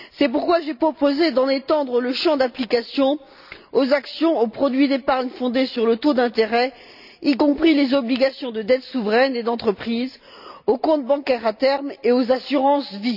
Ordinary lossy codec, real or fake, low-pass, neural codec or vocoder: none; real; 5.4 kHz; none